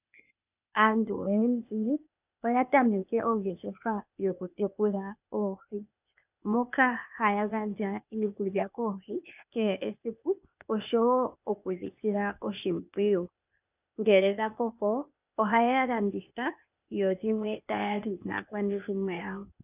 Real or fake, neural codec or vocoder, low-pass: fake; codec, 16 kHz, 0.8 kbps, ZipCodec; 3.6 kHz